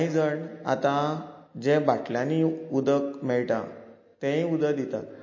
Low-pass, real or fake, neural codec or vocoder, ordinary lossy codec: 7.2 kHz; real; none; MP3, 32 kbps